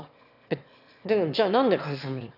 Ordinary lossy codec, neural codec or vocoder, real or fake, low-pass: none; autoencoder, 22.05 kHz, a latent of 192 numbers a frame, VITS, trained on one speaker; fake; 5.4 kHz